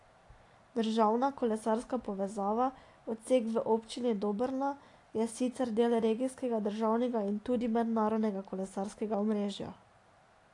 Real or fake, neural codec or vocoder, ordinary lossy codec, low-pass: real; none; AAC, 48 kbps; 10.8 kHz